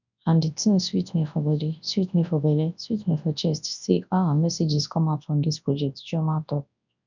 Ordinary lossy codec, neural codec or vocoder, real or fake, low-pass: none; codec, 24 kHz, 0.9 kbps, WavTokenizer, large speech release; fake; 7.2 kHz